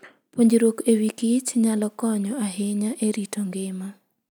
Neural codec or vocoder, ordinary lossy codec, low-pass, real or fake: none; none; none; real